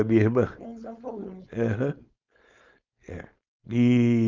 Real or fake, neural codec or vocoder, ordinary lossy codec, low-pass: fake; codec, 16 kHz, 4.8 kbps, FACodec; Opus, 24 kbps; 7.2 kHz